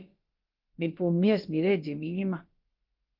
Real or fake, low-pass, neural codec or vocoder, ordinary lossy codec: fake; 5.4 kHz; codec, 16 kHz, about 1 kbps, DyCAST, with the encoder's durations; Opus, 32 kbps